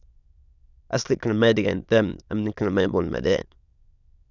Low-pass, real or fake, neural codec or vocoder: 7.2 kHz; fake; autoencoder, 22.05 kHz, a latent of 192 numbers a frame, VITS, trained on many speakers